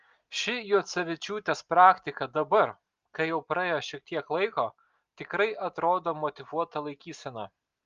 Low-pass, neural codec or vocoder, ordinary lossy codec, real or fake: 7.2 kHz; none; Opus, 32 kbps; real